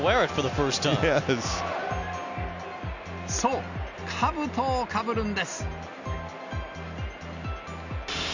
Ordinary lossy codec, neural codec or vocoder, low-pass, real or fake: none; none; 7.2 kHz; real